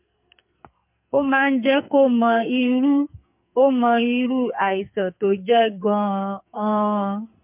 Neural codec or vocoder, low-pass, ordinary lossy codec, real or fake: codec, 44.1 kHz, 2.6 kbps, SNAC; 3.6 kHz; MP3, 32 kbps; fake